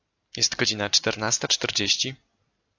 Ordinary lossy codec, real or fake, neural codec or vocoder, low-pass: AAC, 48 kbps; real; none; 7.2 kHz